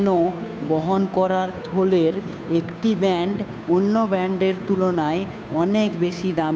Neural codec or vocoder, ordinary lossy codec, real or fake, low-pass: codec, 16 kHz, 2 kbps, FunCodec, trained on Chinese and English, 25 frames a second; none; fake; none